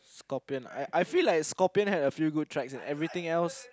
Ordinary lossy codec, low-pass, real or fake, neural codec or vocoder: none; none; real; none